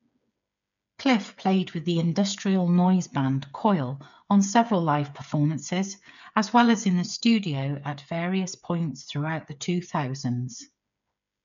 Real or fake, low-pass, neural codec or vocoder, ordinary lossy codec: fake; 7.2 kHz; codec, 16 kHz, 8 kbps, FreqCodec, smaller model; none